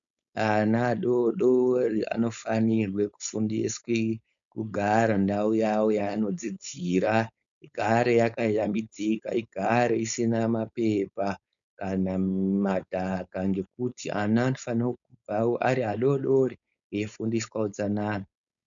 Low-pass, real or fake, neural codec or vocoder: 7.2 kHz; fake; codec, 16 kHz, 4.8 kbps, FACodec